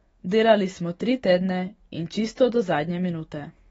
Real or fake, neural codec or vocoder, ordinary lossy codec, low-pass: fake; autoencoder, 48 kHz, 128 numbers a frame, DAC-VAE, trained on Japanese speech; AAC, 24 kbps; 19.8 kHz